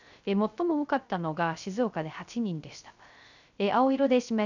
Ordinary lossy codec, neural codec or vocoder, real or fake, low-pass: none; codec, 16 kHz, 0.3 kbps, FocalCodec; fake; 7.2 kHz